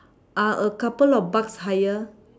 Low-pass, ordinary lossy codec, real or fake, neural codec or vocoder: none; none; real; none